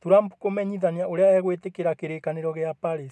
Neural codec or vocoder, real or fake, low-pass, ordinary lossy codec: none; real; none; none